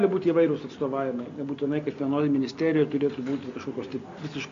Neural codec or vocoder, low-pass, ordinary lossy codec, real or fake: none; 7.2 kHz; MP3, 48 kbps; real